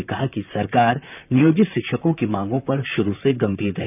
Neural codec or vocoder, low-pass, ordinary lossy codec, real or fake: vocoder, 44.1 kHz, 128 mel bands, Pupu-Vocoder; 3.6 kHz; none; fake